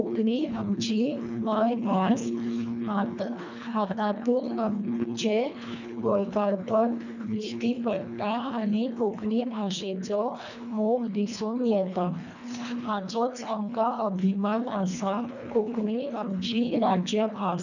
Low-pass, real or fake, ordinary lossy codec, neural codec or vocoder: 7.2 kHz; fake; none; codec, 24 kHz, 1.5 kbps, HILCodec